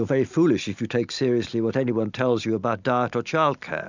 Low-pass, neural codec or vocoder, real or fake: 7.2 kHz; none; real